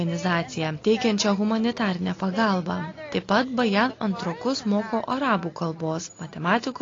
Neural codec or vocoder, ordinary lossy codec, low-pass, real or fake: none; AAC, 32 kbps; 7.2 kHz; real